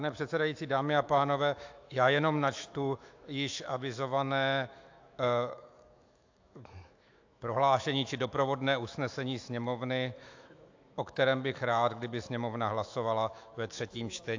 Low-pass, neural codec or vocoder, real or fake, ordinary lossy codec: 7.2 kHz; autoencoder, 48 kHz, 128 numbers a frame, DAC-VAE, trained on Japanese speech; fake; AAC, 48 kbps